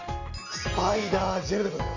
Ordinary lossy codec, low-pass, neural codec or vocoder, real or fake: none; 7.2 kHz; none; real